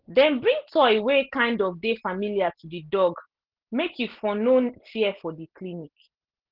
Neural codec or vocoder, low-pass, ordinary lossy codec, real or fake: none; 5.4 kHz; Opus, 16 kbps; real